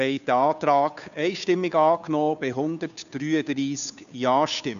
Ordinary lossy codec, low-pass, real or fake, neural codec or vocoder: none; 7.2 kHz; fake; codec, 16 kHz, 2 kbps, FunCodec, trained on Chinese and English, 25 frames a second